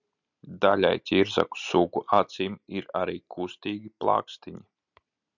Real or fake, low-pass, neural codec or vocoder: real; 7.2 kHz; none